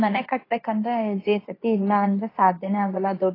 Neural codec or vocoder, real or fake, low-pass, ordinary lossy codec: codec, 24 kHz, 0.9 kbps, WavTokenizer, medium speech release version 2; fake; 5.4 kHz; AAC, 24 kbps